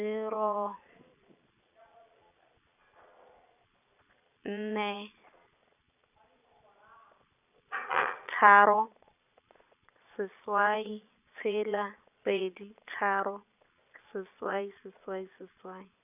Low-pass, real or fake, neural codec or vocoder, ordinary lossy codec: 3.6 kHz; fake; vocoder, 22.05 kHz, 80 mel bands, Vocos; none